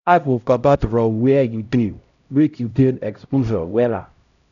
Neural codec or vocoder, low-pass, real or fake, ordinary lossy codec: codec, 16 kHz, 0.5 kbps, X-Codec, HuBERT features, trained on LibriSpeech; 7.2 kHz; fake; none